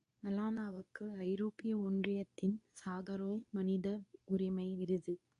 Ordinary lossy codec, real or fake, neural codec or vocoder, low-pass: MP3, 64 kbps; fake; codec, 24 kHz, 0.9 kbps, WavTokenizer, medium speech release version 2; 10.8 kHz